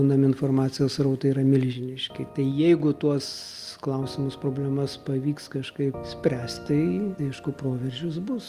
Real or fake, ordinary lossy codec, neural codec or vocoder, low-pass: real; Opus, 32 kbps; none; 14.4 kHz